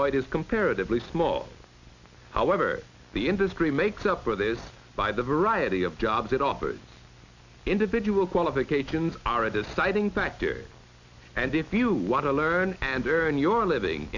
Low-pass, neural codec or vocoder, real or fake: 7.2 kHz; none; real